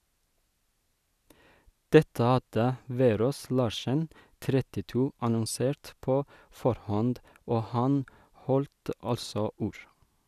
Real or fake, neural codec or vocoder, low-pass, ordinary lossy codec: real; none; 14.4 kHz; none